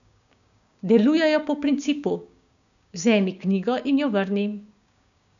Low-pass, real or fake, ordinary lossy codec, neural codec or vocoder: 7.2 kHz; fake; none; codec, 16 kHz, 6 kbps, DAC